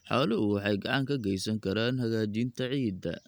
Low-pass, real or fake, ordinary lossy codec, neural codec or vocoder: none; real; none; none